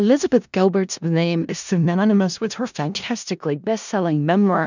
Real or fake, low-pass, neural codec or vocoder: fake; 7.2 kHz; codec, 16 kHz in and 24 kHz out, 0.4 kbps, LongCat-Audio-Codec, four codebook decoder